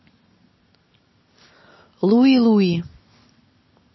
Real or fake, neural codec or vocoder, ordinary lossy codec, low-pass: real; none; MP3, 24 kbps; 7.2 kHz